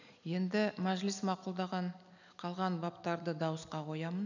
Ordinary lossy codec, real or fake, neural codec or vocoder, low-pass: none; real; none; 7.2 kHz